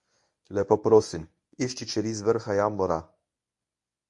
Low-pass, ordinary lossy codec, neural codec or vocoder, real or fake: 10.8 kHz; MP3, 64 kbps; codec, 24 kHz, 0.9 kbps, WavTokenizer, medium speech release version 1; fake